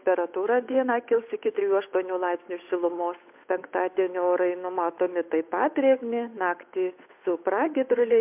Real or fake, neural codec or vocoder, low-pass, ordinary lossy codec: fake; codec, 16 kHz, 8 kbps, FunCodec, trained on Chinese and English, 25 frames a second; 3.6 kHz; MP3, 32 kbps